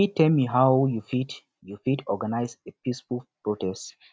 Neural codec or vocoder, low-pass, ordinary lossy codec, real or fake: none; none; none; real